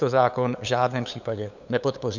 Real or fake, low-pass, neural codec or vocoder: fake; 7.2 kHz; codec, 16 kHz, 8 kbps, FunCodec, trained on LibriTTS, 25 frames a second